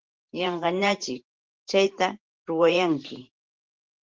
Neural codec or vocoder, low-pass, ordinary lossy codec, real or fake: vocoder, 44.1 kHz, 128 mel bands every 512 samples, BigVGAN v2; 7.2 kHz; Opus, 16 kbps; fake